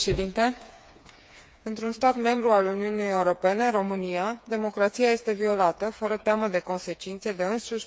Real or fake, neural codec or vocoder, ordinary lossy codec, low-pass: fake; codec, 16 kHz, 4 kbps, FreqCodec, smaller model; none; none